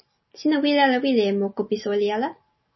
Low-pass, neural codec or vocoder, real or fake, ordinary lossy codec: 7.2 kHz; none; real; MP3, 24 kbps